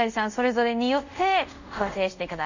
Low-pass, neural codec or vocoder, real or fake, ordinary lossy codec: 7.2 kHz; codec, 24 kHz, 0.5 kbps, DualCodec; fake; none